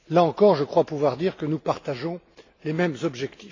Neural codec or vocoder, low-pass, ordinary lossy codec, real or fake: none; 7.2 kHz; AAC, 32 kbps; real